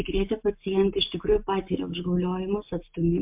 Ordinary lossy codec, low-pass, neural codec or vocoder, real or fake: MP3, 32 kbps; 3.6 kHz; none; real